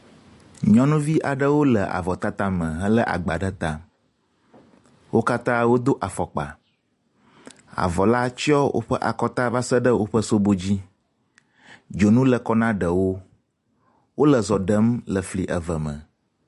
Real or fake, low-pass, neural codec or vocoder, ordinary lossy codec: real; 14.4 kHz; none; MP3, 48 kbps